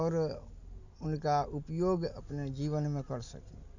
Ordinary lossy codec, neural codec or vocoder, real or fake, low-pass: none; none; real; 7.2 kHz